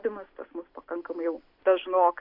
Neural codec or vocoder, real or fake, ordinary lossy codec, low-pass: none; real; MP3, 48 kbps; 5.4 kHz